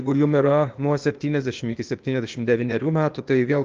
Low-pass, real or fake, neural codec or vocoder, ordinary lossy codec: 7.2 kHz; fake; codec, 16 kHz, 0.8 kbps, ZipCodec; Opus, 24 kbps